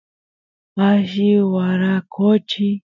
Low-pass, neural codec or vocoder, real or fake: 7.2 kHz; none; real